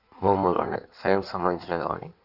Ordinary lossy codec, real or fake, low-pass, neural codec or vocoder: none; fake; 5.4 kHz; codec, 16 kHz in and 24 kHz out, 1.1 kbps, FireRedTTS-2 codec